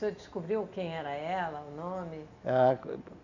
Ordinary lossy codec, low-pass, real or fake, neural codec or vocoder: none; 7.2 kHz; real; none